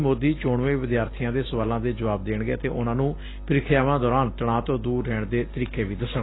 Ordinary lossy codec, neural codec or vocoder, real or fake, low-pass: AAC, 16 kbps; none; real; 7.2 kHz